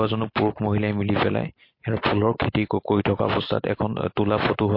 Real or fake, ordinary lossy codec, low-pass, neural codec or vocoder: real; MP3, 32 kbps; 5.4 kHz; none